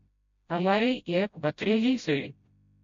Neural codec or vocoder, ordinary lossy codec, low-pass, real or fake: codec, 16 kHz, 0.5 kbps, FreqCodec, smaller model; MP3, 48 kbps; 7.2 kHz; fake